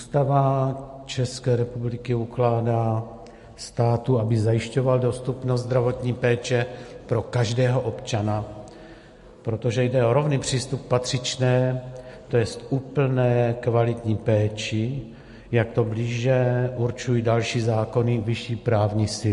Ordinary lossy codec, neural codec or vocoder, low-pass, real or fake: MP3, 48 kbps; none; 14.4 kHz; real